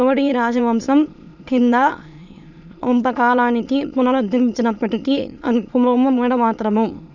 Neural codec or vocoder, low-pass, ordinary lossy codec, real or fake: autoencoder, 22.05 kHz, a latent of 192 numbers a frame, VITS, trained on many speakers; 7.2 kHz; none; fake